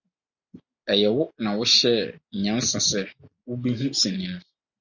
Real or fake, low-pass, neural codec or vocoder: real; 7.2 kHz; none